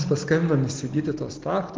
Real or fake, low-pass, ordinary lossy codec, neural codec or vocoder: real; 7.2 kHz; Opus, 16 kbps; none